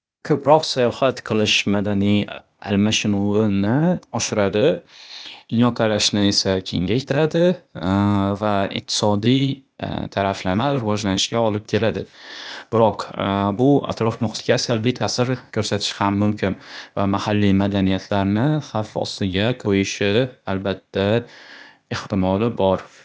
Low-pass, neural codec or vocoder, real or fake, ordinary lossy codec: none; codec, 16 kHz, 0.8 kbps, ZipCodec; fake; none